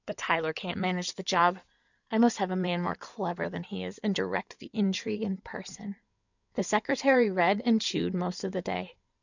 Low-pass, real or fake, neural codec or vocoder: 7.2 kHz; fake; codec, 16 kHz in and 24 kHz out, 2.2 kbps, FireRedTTS-2 codec